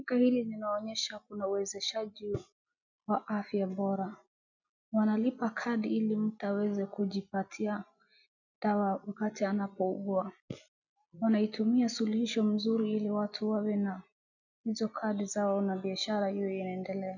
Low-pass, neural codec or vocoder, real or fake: 7.2 kHz; none; real